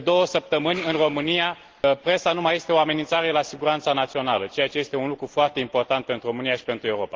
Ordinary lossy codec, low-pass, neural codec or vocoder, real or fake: Opus, 16 kbps; 7.2 kHz; none; real